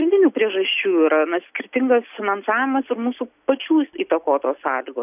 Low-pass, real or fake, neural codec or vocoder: 3.6 kHz; real; none